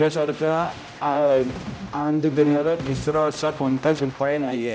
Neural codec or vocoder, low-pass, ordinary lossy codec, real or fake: codec, 16 kHz, 0.5 kbps, X-Codec, HuBERT features, trained on general audio; none; none; fake